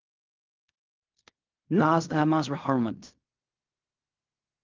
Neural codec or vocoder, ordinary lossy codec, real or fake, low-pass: codec, 16 kHz in and 24 kHz out, 0.4 kbps, LongCat-Audio-Codec, fine tuned four codebook decoder; Opus, 24 kbps; fake; 7.2 kHz